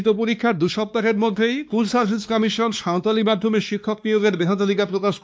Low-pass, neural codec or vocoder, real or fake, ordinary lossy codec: none; codec, 16 kHz, 2 kbps, X-Codec, WavLM features, trained on Multilingual LibriSpeech; fake; none